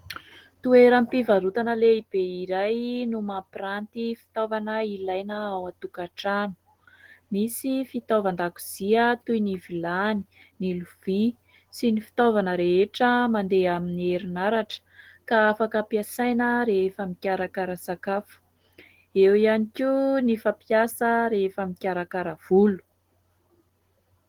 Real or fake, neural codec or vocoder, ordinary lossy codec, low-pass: real; none; Opus, 16 kbps; 19.8 kHz